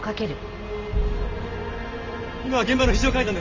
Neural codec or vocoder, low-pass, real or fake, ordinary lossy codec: none; 7.2 kHz; real; Opus, 32 kbps